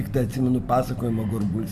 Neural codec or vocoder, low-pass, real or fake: none; 14.4 kHz; real